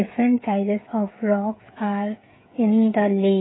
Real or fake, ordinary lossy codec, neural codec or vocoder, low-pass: fake; AAC, 16 kbps; codec, 16 kHz, 16 kbps, FreqCodec, smaller model; 7.2 kHz